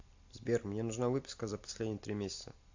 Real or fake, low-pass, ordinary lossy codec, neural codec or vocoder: real; 7.2 kHz; AAC, 48 kbps; none